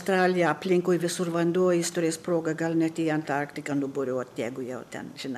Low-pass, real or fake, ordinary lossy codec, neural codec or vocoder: 14.4 kHz; real; MP3, 96 kbps; none